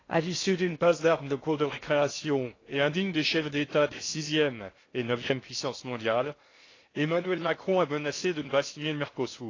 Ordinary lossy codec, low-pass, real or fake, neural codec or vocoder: AAC, 32 kbps; 7.2 kHz; fake; codec, 16 kHz in and 24 kHz out, 0.6 kbps, FocalCodec, streaming, 2048 codes